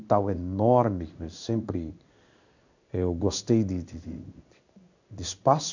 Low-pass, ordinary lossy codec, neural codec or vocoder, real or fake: 7.2 kHz; none; codec, 16 kHz in and 24 kHz out, 1 kbps, XY-Tokenizer; fake